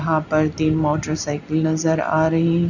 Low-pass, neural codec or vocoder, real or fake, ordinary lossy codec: 7.2 kHz; none; real; none